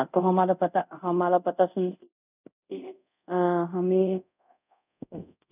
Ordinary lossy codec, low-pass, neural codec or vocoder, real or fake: none; 3.6 kHz; codec, 24 kHz, 0.5 kbps, DualCodec; fake